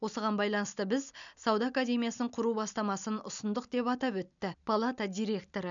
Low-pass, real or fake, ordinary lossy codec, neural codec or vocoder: 7.2 kHz; real; none; none